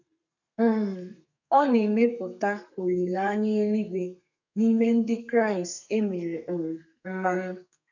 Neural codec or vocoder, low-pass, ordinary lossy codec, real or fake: codec, 32 kHz, 1.9 kbps, SNAC; 7.2 kHz; none; fake